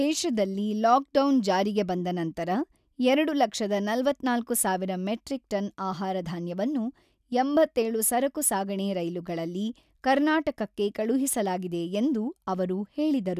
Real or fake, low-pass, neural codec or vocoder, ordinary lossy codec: real; 14.4 kHz; none; none